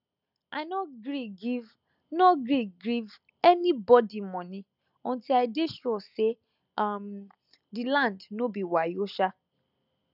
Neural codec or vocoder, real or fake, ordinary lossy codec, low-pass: none; real; none; 5.4 kHz